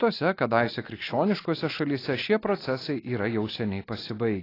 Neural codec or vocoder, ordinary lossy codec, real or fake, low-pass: none; AAC, 24 kbps; real; 5.4 kHz